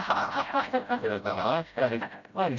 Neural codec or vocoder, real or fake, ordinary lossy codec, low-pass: codec, 16 kHz, 0.5 kbps, FreqCodec, smaller model; fake; none; 7.2 kHz